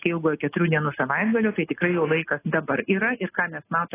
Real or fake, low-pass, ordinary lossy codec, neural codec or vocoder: real; 3.6 kHz; AAC, 16 kbps; none